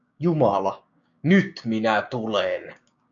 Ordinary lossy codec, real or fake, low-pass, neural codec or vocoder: MP3, 64 kbps; fake; 7.2 kHz; codec, 16 kHz, 6 kbps, DAC